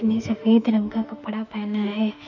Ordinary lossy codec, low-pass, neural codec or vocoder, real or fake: none; 7.2 kHz; autoencoder, 48 kHz, 32 numbers a frame, DAC-VAE, trained on Japanese speech; fake